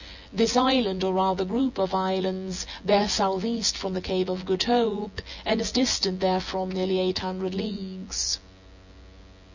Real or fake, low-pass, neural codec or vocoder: fake; 7.2 kHz; vocoder, 24 kHz, 100 mel bands, Vocos